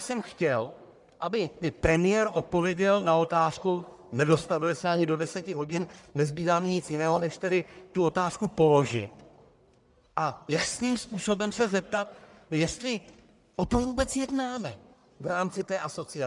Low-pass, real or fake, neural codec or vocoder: 10.8 kHz; fake; codec, 44.1 kHz, 1.7 kbps, Pupu-Codec